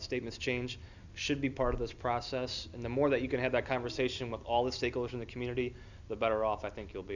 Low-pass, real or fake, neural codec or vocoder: 7.2 kHz; real; none